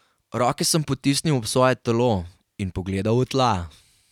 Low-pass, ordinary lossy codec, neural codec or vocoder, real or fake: 19.8 kHz; none; none; real